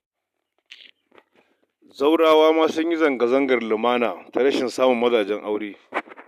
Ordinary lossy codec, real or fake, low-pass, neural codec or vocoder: none; real; 14.4 kHz; none